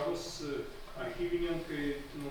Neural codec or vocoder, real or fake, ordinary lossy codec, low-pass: none; real; Opus, 64 kbps; 19.8 kHz